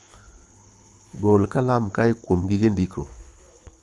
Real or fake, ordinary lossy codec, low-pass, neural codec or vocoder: fake; none; none; codec, 24 kHz, 6 kbps, HILCodec